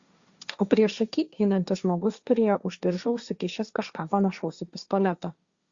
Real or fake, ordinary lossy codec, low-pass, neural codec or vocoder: fake; Opus, 64 kbps; 7.2 kHz; codec, 16 kHz, 1.1 kbps, Voila-Tokenizer